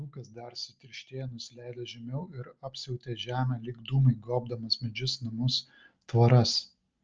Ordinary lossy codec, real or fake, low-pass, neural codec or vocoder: Opus, 24 kbps; real; 7.2 kHz; none